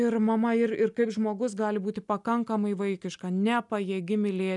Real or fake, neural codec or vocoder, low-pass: real; none; 10.8 kHz